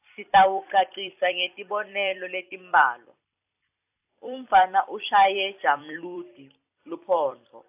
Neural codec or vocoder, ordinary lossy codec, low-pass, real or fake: vocoder, 44.1 kHz, 128 mel bands every 256 samples, BigVGAN v2; AAC, 32 kbps; 3.6 kHz; fake